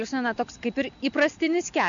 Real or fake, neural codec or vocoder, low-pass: real; none; 7.2 kHz